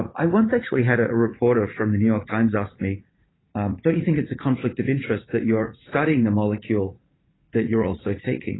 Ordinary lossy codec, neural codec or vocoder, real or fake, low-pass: AAC, 16 kbps; vocoder, 44.1 kHz, 80 mel bands, Vocos; fake; 7.2 kHz